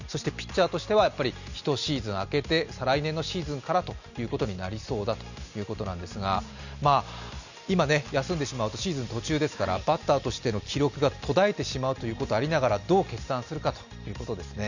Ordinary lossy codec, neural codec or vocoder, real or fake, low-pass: none; none; real; 7.2 kHz